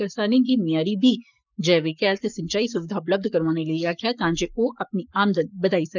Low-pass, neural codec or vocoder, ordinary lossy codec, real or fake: 7.2 kHz; codec, 44.1 kHz, 7.8 kbps, Pupu-Codec; none; fake